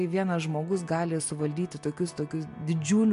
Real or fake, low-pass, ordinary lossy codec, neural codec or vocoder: real; 10.8 kHz; MP3, 64 kbps; none